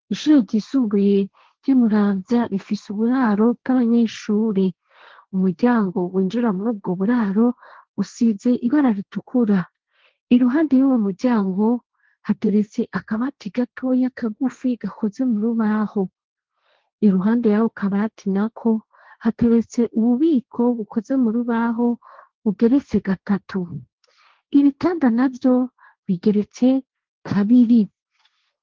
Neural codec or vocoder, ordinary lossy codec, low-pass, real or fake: codec, 16 kHz, 1.1 kbps, Voila-Tokenizer; Opus, 16 kbps; 7.2 kHz; fake